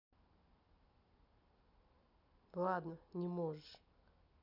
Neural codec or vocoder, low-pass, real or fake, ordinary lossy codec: none; 5.4 kHz; real; none